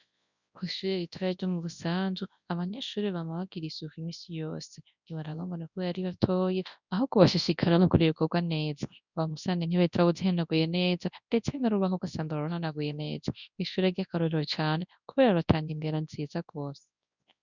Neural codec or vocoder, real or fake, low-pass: codec, 24 kHz, 0.9 kbps, WavTokenizer, large speech release; fake; 7.2 kHz